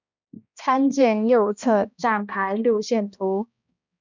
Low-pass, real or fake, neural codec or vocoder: 7.2 kHz; fake; codec, 16 kHz, 1 kbps, X-Codec, HuBERT features, trained on balanced general audio